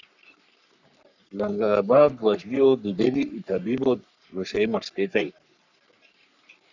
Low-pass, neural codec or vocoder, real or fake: 7.2 kHz; codec, 44.1 kHz, 3.4 kbps, Pupu-Codec; fake